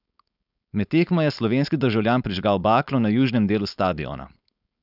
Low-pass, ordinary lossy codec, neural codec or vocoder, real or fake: 5.4 kHz; none; codec, 16 kHz, 4.8 kbps, FACodec; fake